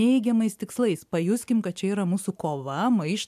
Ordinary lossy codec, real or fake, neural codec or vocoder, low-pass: MP3, 96 kbps; real; none; 14.4 kHz